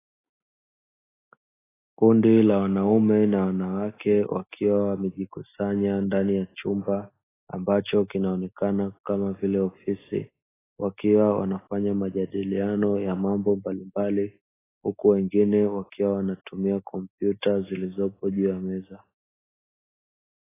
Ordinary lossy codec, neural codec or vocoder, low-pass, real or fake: AAC, 16 kbps; none; 3.6 kHz; real